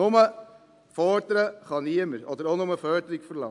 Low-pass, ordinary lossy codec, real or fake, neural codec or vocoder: 10.8 kHz; AAC, 64 kbps; real; none